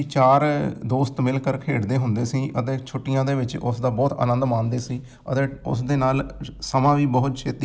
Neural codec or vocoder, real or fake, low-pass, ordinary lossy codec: none; real; none; none